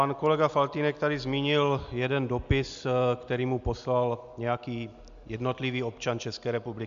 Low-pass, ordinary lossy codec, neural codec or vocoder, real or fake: 7.2 kHz; MP3, 64 kbps; none; real